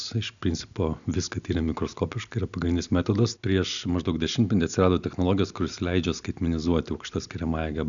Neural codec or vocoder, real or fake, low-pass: none; real; 7.2 kHz